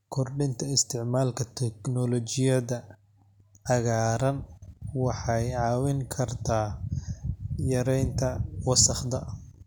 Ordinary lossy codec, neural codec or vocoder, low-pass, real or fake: none; none; 19.8 kHz; real